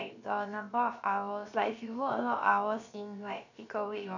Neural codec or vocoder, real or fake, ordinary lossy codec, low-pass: codec, 16 kHz, 0.7 kbps, FocalCodec; fake; none; 7.2 kHz